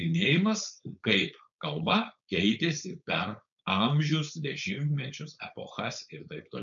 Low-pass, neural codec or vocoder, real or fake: 7.2 kHz; codec, 16 kHz, 4.8 kbps, FACodec; fake